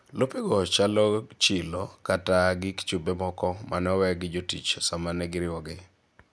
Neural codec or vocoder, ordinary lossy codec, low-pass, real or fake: none; none; none; real